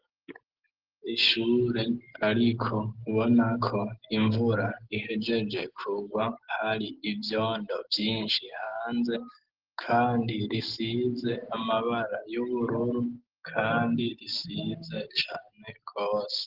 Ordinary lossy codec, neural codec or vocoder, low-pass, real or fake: Opus, 16 kbps; none; 5.4 kHz; real